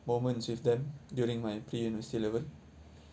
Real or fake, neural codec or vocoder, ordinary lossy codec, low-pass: real; none; none; none